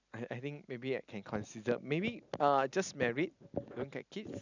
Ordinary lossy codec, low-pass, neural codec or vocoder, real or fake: none; 7.2 kHz; none; real